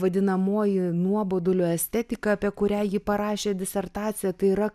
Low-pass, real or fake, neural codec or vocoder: 14.4 kHz; real; none